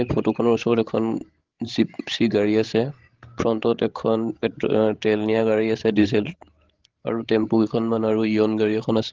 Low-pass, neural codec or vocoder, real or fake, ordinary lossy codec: 7.2 kHz; codec, 16 kHz, 16 kbps, FreqCodec, larger model; fake; Opus, 16 kbps